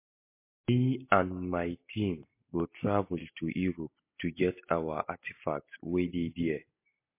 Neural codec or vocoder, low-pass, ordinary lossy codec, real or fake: none; 3.6 kHz; MP3, 24 kbps; real